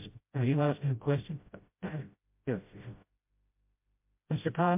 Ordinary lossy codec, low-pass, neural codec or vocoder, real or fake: MP3, 32 kbps; 3.6 kHz; codec, 16 kHz, 0.5 kbps, FreqCodec, smaller model; fake